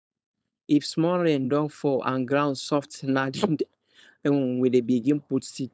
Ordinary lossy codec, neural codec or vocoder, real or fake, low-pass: none; codec, 16 kHz, 4.8 kbps, FACodec; fake; none